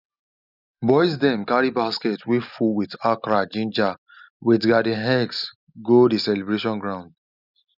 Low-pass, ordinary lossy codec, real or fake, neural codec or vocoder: 5.4 kHz; none; real; none